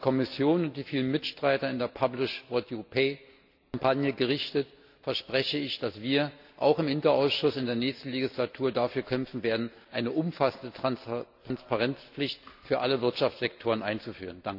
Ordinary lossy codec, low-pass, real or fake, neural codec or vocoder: AAC, 48 kbps; 5.4 kHz; real; none